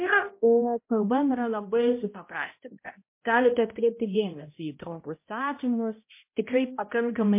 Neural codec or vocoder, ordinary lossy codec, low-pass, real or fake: codec, 16 kHz, 0.5 kbps, X-Codec, HuBERT features, trained on balanced general audio; MP3, 24 kbps; 3.6 kHz; fake